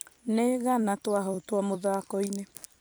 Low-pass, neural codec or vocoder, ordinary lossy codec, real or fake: none; vocoder, 44.1 kHz, 128 mel bands every 256 samples, BigVGAN v2; none; fake